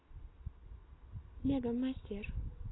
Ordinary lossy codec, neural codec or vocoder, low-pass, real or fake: AAC, 16 kbps; none; 7.2 kHz; real